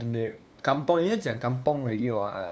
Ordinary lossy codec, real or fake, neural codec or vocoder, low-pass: none; fake; codec, 16 kHz, 2 kbps, FunCodec, trained on LibriTTS, 25 frames a second; none